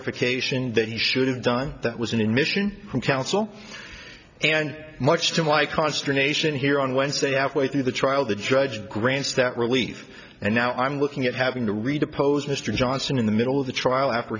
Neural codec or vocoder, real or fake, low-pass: none; real; 7.2 kHz